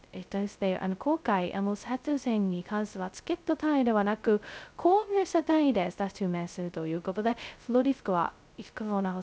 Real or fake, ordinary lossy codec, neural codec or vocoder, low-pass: fake; none; codec, 16 kHz, 0.2 kbps, FocalCodec; none